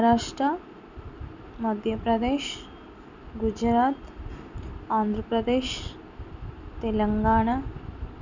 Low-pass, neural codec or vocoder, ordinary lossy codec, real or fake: 7.2 kHz; none; none; real